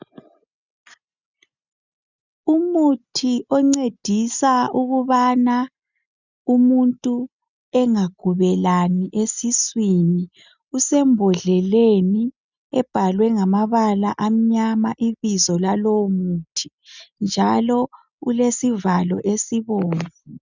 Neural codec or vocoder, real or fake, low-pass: none; real; 7.2 kHz